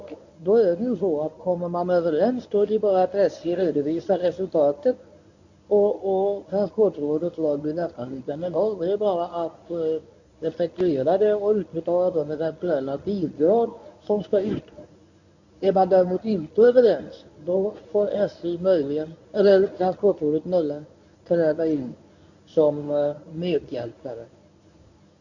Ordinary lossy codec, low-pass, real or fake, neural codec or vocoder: none; 7.2 kHz; fake; codec, 24 kHz, 0.9 kbps, WavTokenizer, medium speech release version 1